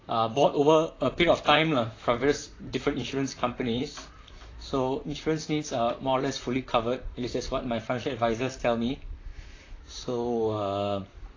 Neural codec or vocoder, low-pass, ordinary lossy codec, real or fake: vocoder, 44.1 kHz, 128 mel bands, Pupu-Vocoder; 7.2 kHz; AAC, 32 kbps; fake